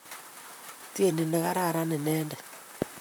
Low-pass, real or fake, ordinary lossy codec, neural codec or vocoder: none; fake; none; vocoder, 44.1 kHz, 128 mel bands, Pupu-Vocoder